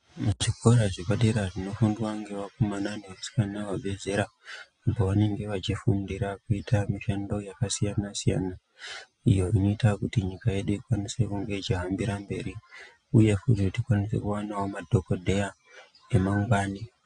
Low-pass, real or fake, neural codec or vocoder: 9.9 kHz; real; none